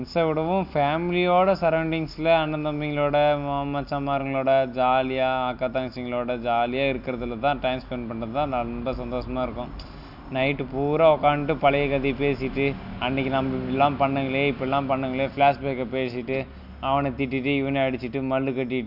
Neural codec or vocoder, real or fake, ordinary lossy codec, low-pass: none; real; none; 5.4 kHz